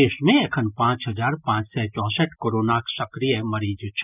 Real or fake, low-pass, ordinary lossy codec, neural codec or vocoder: real; 3.6 kHz; none; none